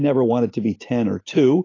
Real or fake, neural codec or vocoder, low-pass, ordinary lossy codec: real; none; 7.2 kHz; AAC, 32 kbps